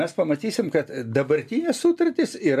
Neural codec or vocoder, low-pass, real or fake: none; 14.4 kHz; real